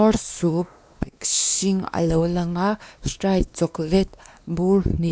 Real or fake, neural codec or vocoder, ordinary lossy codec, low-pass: fake; codec, 16 kHz, 2 kbps, X-Codec, WavLM features, trained on Multilingual LibriSpeech; none; none